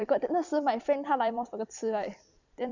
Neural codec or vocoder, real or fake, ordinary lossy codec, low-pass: vocoder, 44.1 kHz, 128 mel bands every 256 samples, BigVGAN v2; fake; none; 7.2 kHz